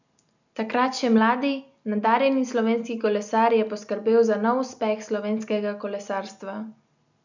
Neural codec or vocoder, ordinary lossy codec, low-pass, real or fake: none; none; 7.2 kHz; real